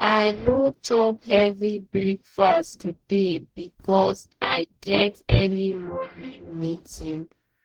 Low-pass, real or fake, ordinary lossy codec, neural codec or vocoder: 19.8 kHz; fake; Opus, 16 kbps; codec, 44.1 kHz, 0.9 kbps, DAC